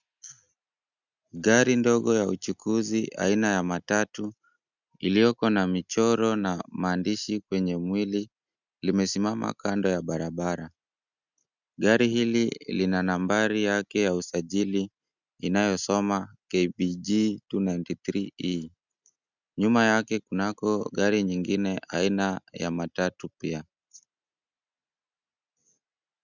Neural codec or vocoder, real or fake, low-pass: none; real; 7.2 kHz